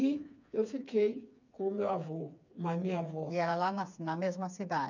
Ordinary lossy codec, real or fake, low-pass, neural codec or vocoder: none; fake; 7.2 kHz; codec, 16 kHz, 4 kbps, FreqCodec, smaller model